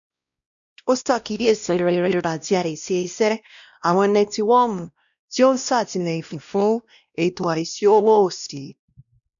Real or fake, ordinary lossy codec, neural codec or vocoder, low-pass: fake; none; codec, 16 kHz, 1 kbps, X-Codec, WavLM features, trained on Multilingual LibriSpeech; 7.2 kHz